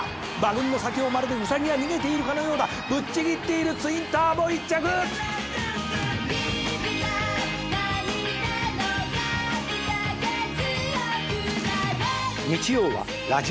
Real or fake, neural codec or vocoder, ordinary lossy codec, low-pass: real; none; none; none